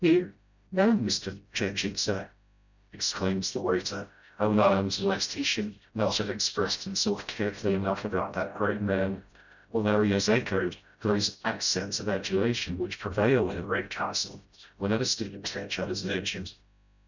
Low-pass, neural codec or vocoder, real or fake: 7.2 kHz; codec, 16 kHz, 0.5 kbps, FreqCodec, smaller model; fake